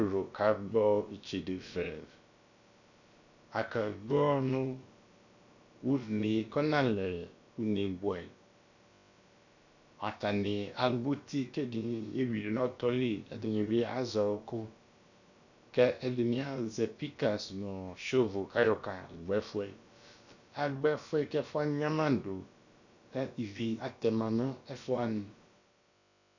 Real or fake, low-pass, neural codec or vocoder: fake; 7.2 kHz; codec, 16 kHz, about 1 kbps, DyCAST, with the encoder's durations